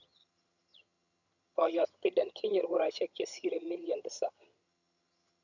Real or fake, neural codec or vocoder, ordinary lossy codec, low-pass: fake; vocoder, 22.05 kHz, 80 mel bands, HiFi-GAN; none; 7.2 kHz